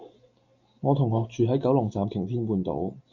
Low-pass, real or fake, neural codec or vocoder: 7.2 kHz; real; none